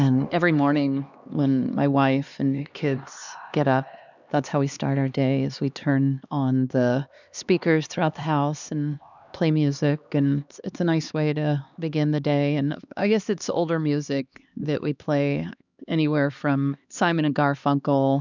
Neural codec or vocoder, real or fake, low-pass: codec, 16 kHz, 2 kbps, X-Codec, HuBERT features, trained on LibriSpeech; fake; 7.2 kHz